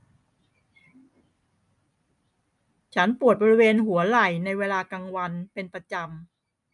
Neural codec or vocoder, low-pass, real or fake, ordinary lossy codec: none; 10.8 kHz; real; none